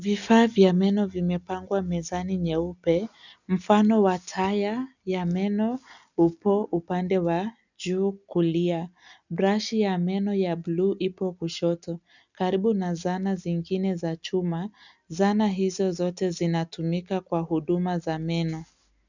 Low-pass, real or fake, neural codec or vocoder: 7.2 kHz; real; none